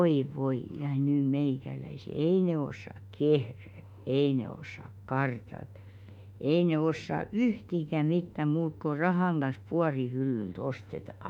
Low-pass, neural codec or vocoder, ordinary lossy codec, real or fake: 19.8 kHz; autoencoder, 48 kHz, 32 numbers a frame, DAC-VAE, trained on Japanese speech; none; fake